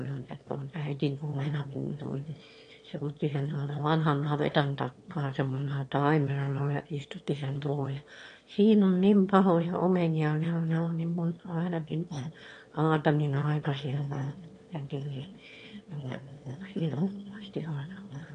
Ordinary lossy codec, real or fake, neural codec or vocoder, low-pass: AAC, 48 kbps; fake; autoencoder, 22.05 kHz, a latent of 192 numbers a frame, VITS, trained on one speaker; 9.9 kHz